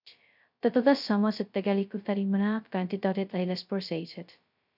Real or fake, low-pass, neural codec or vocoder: fake; 5.4 kHz; codec, 16 kHz, 0.2 kbps, FocalCodec